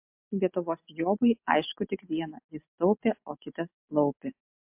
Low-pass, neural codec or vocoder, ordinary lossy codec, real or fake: 3.6 kHz; none; MP3, 32 kbps; real